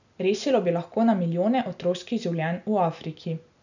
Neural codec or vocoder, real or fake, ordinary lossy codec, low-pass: none; real; none; 7.2 kHz